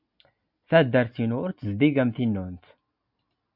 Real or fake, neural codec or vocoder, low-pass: real; none; 5.4 kHz